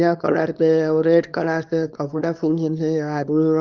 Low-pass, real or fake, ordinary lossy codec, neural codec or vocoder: 7.2 kHz; fake; Opus, 32 kbps; codec, 24 kHz, 0.9 kbps, WavTokenizer, small release